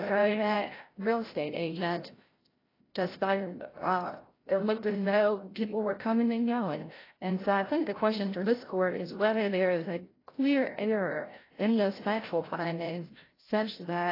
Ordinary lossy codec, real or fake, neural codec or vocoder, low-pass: AAC, 24 kbps; fake; codec, 16 kHz, 0.5 kbps, FreqCodec, larger model; 5.4 kHz